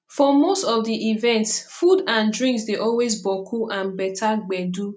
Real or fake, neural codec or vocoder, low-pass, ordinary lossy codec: real; none; none; none